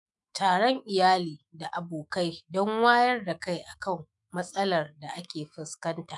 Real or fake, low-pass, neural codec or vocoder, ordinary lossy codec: fake; 10.8 kHz; autoencoder, 48 kHz, 128 numbers a frame, DAC-VAE, trained on Japanese speech; none